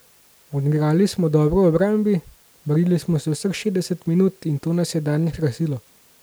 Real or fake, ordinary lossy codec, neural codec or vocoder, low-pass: real; none; none; none